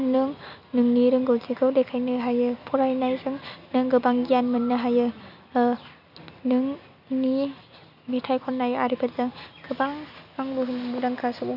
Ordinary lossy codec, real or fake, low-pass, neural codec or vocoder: none; real; 5.4 kHz; none